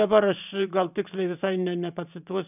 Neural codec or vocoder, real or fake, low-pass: vocoder, 44.1 kHz, 128 mel bands every 512 samples, BigVGAN v2; fake; 3.6 kHz